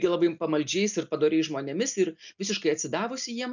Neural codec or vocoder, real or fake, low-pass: none; real; 7.2 kHz